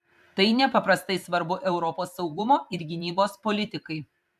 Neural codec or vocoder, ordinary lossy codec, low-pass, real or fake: vocoder, 44.1 kHz, 128 mel bands every 512 samples, BigVGAN v2; MP3, 96 kbps; 14.4 kHz; fake